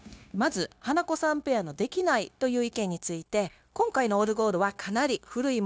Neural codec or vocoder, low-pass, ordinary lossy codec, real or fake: codec, 16 kHz, 0.9 kbps, LongCat-Audio-Codec; none; none; fake